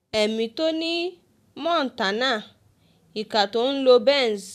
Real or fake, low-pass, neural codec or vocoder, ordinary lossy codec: fake; 14.4 kHz; vocoder, 44.1 kHz, 128 mel bands every 256 samples, BigVGAN v2; none